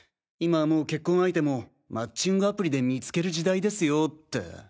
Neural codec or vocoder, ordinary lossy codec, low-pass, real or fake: none; none; none; real